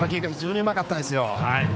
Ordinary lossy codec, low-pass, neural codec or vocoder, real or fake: none; none; codec, 16 kHz, 4 kbps, X-Codec, HuBERT features, trained on general audio; fake